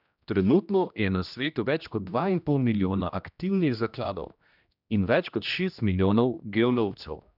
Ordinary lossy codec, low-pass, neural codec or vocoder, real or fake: none; 5.4 kHz; codec, 16 kHz, 1 kbps, X-Codec, HuBERT features, trained on general audio; fake